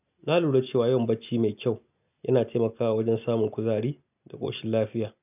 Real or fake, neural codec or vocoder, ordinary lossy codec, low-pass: real; none; none; 3.6 kHz